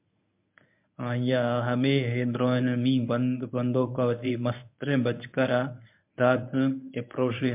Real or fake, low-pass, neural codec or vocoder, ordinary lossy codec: fake; 3.6 kHz; codec, 24 kHz, 0.9 kbps, WavTokenizer, medium speech release version 1; MP3, 32 kbps